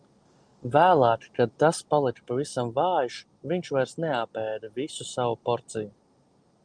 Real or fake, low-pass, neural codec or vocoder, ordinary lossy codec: real; 9.9 kHz; none; Opus, 32 kbps